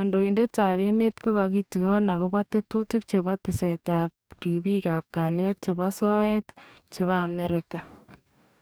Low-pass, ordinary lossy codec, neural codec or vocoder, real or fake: none; none; codec, 44.1 kHz, 2.6 kbps, DAC; fake